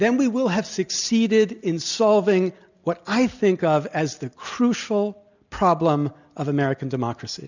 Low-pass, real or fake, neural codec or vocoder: 7.2 kHz; real; none